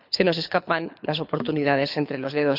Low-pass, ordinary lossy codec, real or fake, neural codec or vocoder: 5.4 kHz; none; fake; codec, 24 kHz, 6 kbps, HILCodec